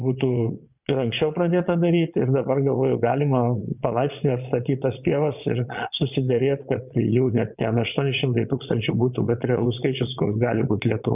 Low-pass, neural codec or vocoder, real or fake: 3.6 kHz; vocoder, 22.05 kHz, 80 mel bands, Vocos; fake